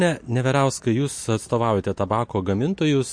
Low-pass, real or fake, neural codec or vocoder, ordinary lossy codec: 9.9 kHz; real; none; MP3, 48 kbps